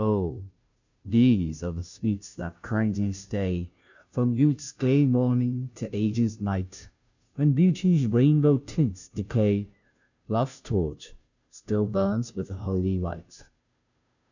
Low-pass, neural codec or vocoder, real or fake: 7.2 kHz; codec, 16 kHz, 0.5 kbps, FunCodec, trained on Chinese and English, 25 frames a second; fake